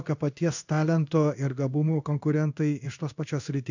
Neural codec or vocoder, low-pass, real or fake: codec, 16 kHz in and 24 kHz out, 1 kbps, XY-Tokenizer; 7.2 kHz; fake